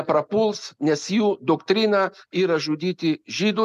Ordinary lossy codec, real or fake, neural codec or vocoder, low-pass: MP3, 96 kbps; real; none; 14.4 kHz